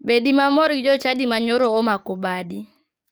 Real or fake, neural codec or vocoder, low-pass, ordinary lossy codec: fake; codec, 44.1 kHz, 7.8 kbps, DAC; none; none